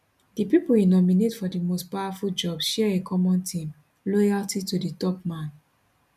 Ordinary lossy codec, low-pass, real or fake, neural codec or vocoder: none; 14.4 kHz; real; none